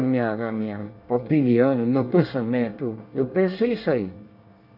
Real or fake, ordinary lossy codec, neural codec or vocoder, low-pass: fake; none; codec, 24 kHz, 1 kbps, SNAC; 5.4 kHz